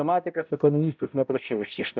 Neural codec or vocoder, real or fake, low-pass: codec, 16 kHz, 0.5 kbps, X-Codec, HuBERT features, trained on balanced general audio; fake; 7.2 kHz